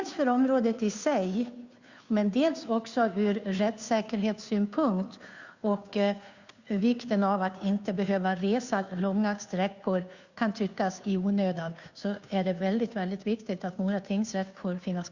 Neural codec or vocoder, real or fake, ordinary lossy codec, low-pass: codec, 16 kHz, 2 kbps, FunCodec, trained on Chinese and English, 25 frames a second; fake; Opus, 64 kbps; 7.2 kHz